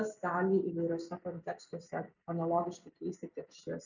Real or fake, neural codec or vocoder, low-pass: real; none; 7.2 kHz